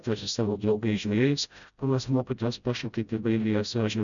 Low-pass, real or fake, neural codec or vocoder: 7.2 kHz; fake; codec, 16 kHz, 0.5 kbps, FreqCodec, smaller model